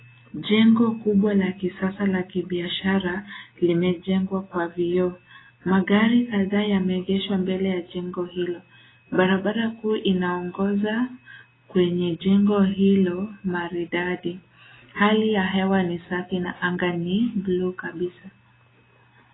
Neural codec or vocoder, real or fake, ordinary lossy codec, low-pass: none; real; AAC, 16 kbps; 7.2 kHz